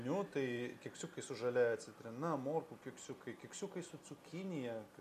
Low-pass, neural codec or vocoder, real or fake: 14.4 kHz; none; real